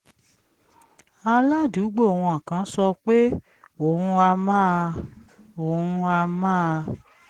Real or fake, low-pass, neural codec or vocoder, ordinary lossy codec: fake; 19.8 kHz; codec, 44.1 kHz, 7.8 kbps, Pupu-Codec; Opus, 16 kbps